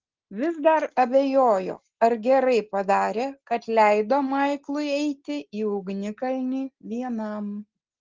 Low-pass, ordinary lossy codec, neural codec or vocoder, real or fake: 7.2 kHz; Opus, 16 kbps; none; real